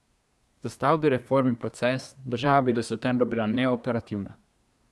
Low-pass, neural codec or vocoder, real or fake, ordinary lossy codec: none; codec, 24 kHz, 1 kbps, SNAC; fake; none